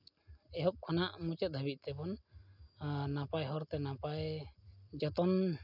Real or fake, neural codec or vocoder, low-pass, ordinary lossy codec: real; none; 5.4 kHz; none